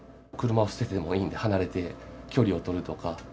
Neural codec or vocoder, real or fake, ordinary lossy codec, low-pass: none; real; none; none